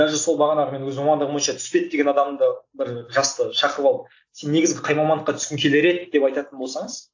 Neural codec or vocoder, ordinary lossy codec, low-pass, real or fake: none; AAC, 48 kbps; 7.2 kHz; real